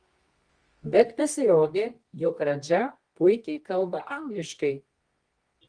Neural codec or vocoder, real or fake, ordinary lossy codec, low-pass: codec, 24 kHz, 0.9 kbps, WavTokenizer, medium music audio release; fake; Opus, 24 kbps; 9.9 kHz